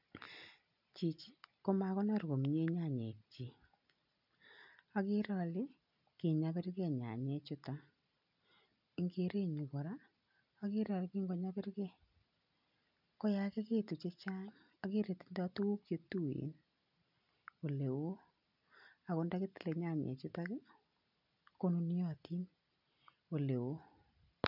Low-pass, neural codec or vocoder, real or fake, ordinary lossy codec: 5.4 kHz; none; real; none